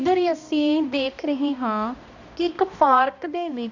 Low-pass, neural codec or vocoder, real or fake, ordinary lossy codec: 7.2 kHz; codec, 16 kHz, 1 kbps, X-Codec, HuBERT features, trained on balanced general audio; fake; Opus, 64 kbps